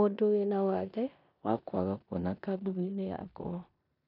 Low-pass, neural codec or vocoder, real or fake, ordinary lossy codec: 5.4 kHz; codec, 16 kHz in and 24 kHz out, 0.9 kbps, LongCat-Audio-Codec, four codebook decoder; fake; none